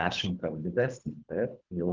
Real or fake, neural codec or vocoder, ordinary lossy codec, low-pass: fake; codec, 24 kHz, 3 kbps, HILCodec; Opus, 24 kbps; 7.2 kHz